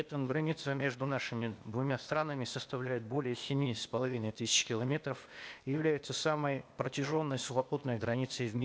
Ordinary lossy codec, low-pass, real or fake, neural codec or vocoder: none; none; fake; codec, 16 kHz, 0.8 kbps, ZipCodec